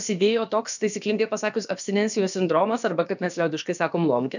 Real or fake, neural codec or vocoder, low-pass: fake; codec, 16 kHz, about 1 kbps, DyCAST, with the encoder's durations; 7.2 kHz